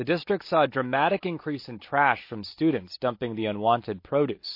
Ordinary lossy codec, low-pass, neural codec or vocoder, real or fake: MP3, 32 kbps; 5.4 kHz; none; real